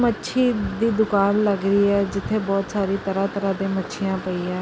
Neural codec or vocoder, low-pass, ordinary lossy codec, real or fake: none; none; none; real